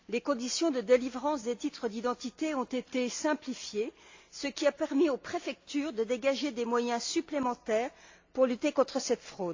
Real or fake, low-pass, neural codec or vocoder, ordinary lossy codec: real; 7.2 kHz; none; AAC, 48 kbps